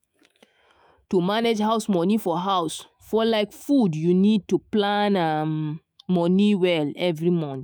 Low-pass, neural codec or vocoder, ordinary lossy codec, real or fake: none; autoencoder, 48 kHz, 128 numbers a frame, DAC-VAE, trained on Japanese speech; none; fake